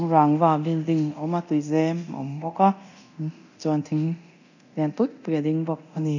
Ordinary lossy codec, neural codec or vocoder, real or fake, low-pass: none; codec, 24 kHz, 0.9 kbps, DualCodec; fake; 7.2 kHz